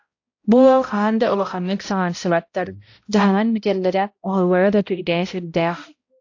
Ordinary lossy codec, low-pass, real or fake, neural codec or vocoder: AAC, 48 kbps; 7.2 kHz; fake; codec, 16 kHz, 0.5 kbps, X-Codec, HuBERT features, trained on balanced general audio